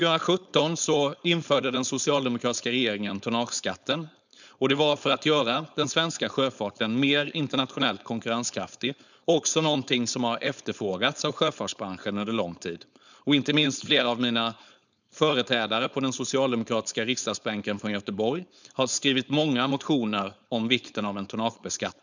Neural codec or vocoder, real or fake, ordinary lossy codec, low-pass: codec, 16 kHz, 4.8 kbps, FACodec; fake; none; 7.2 kHz